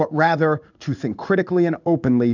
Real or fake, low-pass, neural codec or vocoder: fake; 7.2 kHz; codec, 16 kHz in and 24 kHz out, 1 kbps, XY-Tokenizer